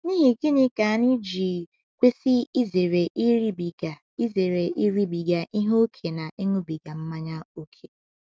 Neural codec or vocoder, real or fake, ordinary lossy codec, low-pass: none; real; none; none